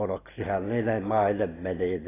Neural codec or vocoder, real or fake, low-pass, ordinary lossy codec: none; real; 3.6 kHz; AAC, 16 kbps